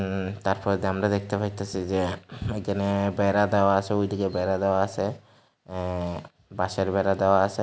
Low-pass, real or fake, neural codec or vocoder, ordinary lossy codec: none; real; none; none